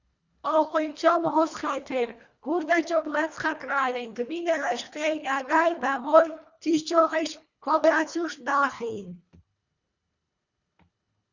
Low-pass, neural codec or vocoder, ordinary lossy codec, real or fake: 7.2 kHz; codec, 24 kHz, 1.5 kbps, HILCodec; Opus, 64 kbps; fake